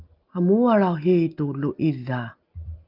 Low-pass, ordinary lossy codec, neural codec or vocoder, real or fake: 5.4 kHz; Opus, 32 kbps; none; real